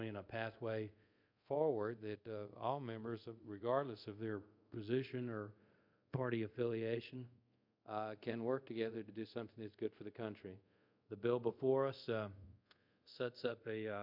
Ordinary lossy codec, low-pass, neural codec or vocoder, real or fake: MP3, 48 kbps; 5.4 kHz; codec, 24 kHz, 0.5 kbps, DualCodec; fake